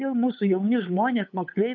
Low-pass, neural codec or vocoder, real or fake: 7.2 kHz; codec, 16 kHz, 8 kbps, FunCodec, trained on LibriTTS, 25 frames a second; fake